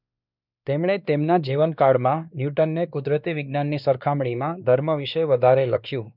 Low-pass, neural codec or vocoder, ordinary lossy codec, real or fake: 5.4 kHz; codec, 16 kHz, 2 kbps, X-Codec, WavLM features, trained on Multilingual LibriSpeech; none; fake